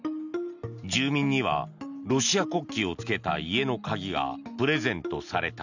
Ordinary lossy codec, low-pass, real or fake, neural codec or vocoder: none; 7.2 kHz; real; none